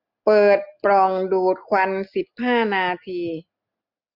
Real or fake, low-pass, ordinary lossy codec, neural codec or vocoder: real; 5.4 kHz; Opus, 64 kbps; none